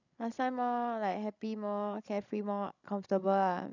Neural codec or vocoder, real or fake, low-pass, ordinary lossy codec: codec, 16 kHz, 16 kbps, FreqCodec, larger model; fake; 7.2 kHz; none